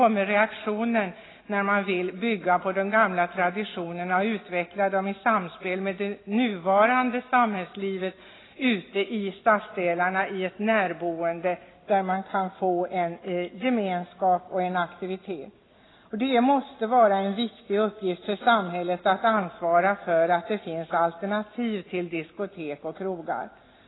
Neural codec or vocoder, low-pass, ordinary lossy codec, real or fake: none; 7.2 kHz; AAC, 16 kbps; real